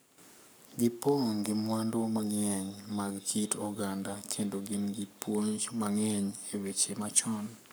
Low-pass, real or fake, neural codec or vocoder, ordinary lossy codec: none; fake; codec, 44.1 kHz, 7.8 kbps, Pupu-Codec; none